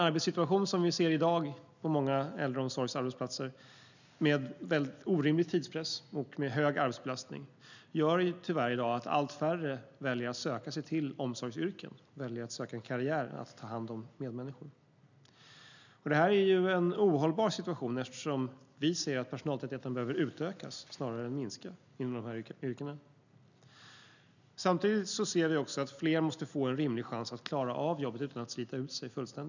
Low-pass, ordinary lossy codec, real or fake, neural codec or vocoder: 7.2 kHz; none; real; none